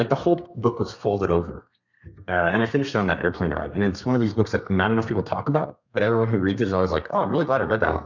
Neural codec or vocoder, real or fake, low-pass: codec, 32 kHz, 1.9 kbps, SNAC; fake; 7.2 kHz